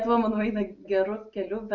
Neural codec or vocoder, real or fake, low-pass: none; real; 7.2 kHz